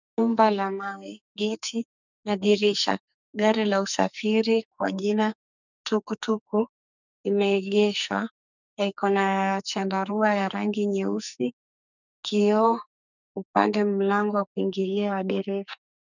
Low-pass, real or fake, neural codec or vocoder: 7.2 kHz; fake; codec, 44.1 kHz, 2.6 kbps, SNAC